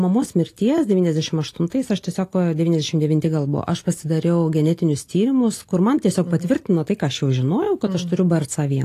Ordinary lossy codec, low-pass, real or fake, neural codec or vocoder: AAC, 48 kbps; 14.4 kHz; real; none